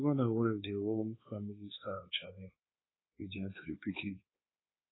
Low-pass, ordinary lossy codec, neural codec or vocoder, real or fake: 7.2 kHz; AAC, 16 kbps; codec, 24 kHz, 1.2 kbps, DualCodec; fake